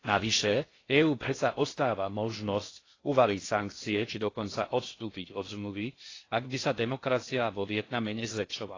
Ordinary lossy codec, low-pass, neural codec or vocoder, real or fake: AAC, 32 kbps; 7.2 kHz; codec, 16 kHz in and 24 kHz out, 0.6 kbps, FocalCodec, streaming, 4096 codes; fake